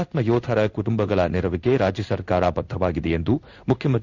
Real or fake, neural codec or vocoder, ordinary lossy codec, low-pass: fake; codec, 16 kHz in and 24 kHz out, 1 kbps, XY-Tokenizer; none; 7.2 kHz